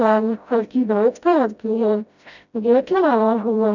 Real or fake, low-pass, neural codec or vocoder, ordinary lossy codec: fake; 7.2 kHz; codec, 16 kHz, 0.5 kbps, FreqCodec, smaller model; none